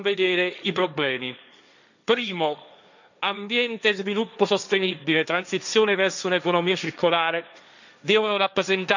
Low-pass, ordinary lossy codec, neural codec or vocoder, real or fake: 7.2 kHz; none; codec, 16 kHz, 1.1 kbps, Voila-Tokenizer; fake